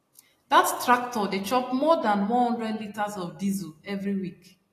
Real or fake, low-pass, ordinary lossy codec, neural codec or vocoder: real; 14.4 kHz; AAC, 48 kbps; none